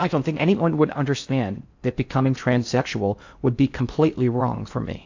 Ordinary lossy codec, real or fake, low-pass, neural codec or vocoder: AAC, 48 kbps; fake; 7.2 kHz; codec, 16 kHz in and 24 kHz out, 0.6 kbps, FocalCodec, streaming, 4096 codes